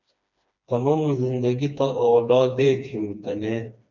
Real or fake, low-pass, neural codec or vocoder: fake; 7.2 kHz; codec, 16 kHz, 2 kbps, FreqCodec, smaller model